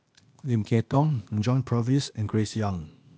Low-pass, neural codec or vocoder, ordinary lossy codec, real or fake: none; codec, 16 kHz, 0.8 kbps, ZipCodec; none; fake